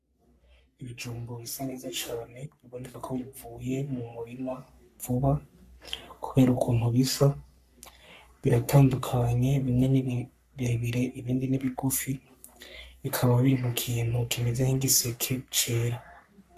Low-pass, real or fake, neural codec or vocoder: 14.4 kHz; fake; codec, 44.1 kHz, 3.4 kbps, Pupu-Codec